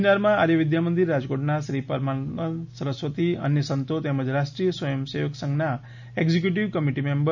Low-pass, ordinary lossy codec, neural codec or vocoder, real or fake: 7.2 kHz; none; none; real